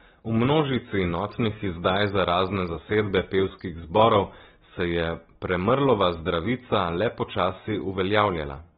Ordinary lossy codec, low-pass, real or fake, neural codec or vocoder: AAC, 16 kbps; 19.8 kHz; real; none